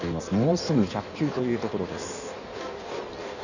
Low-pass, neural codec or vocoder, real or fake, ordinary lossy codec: 7.2 kHz; codec, 16 kHz in and 24 kHz out, 1.1 kbps, FireRedTTS-2 codec; fake; none